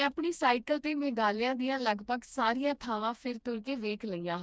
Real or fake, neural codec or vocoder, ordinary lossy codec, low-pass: fake; codec, 16 kHz, 2 kbps, FreqCodec, smaller model; none; none